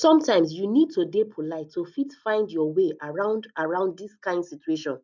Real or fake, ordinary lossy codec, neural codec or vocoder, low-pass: real; none; none; 7.2 kHz